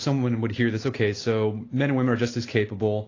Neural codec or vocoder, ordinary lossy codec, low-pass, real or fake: none; AAC, 32 kbps; 7.2 kHz; real